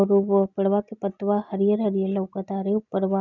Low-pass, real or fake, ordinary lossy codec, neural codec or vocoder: 7.2 kHz; real; none; none